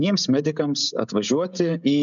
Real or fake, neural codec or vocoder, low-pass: fake; codec, 16 kHz, 16 kbps, FreqCodec, smaller model; 7.2 kHz